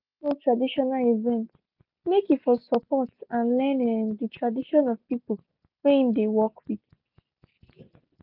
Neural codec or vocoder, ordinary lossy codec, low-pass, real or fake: none; none; 5.4 kHz; real